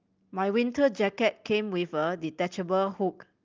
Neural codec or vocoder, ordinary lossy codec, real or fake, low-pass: none; Opus, 32 kbps; real; 7.2 kHz